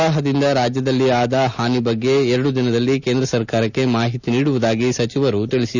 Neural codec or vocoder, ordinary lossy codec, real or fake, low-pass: none; none; real; 7.2 kHz